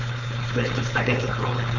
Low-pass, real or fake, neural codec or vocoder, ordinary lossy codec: 7.2 kHz; fake; codec, 16 kHz, 4.8 kbps, FACodec; none